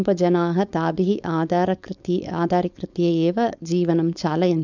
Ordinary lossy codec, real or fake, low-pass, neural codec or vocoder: none; fake; 7.2 kHz; codec, 16 kHz, 4.8 kbps, FACodec